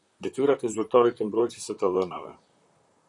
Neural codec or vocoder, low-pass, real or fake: vocoder, 44.1 kHz, 128 mel bands, Pupu-Vocoder; 10.8 kHz; fake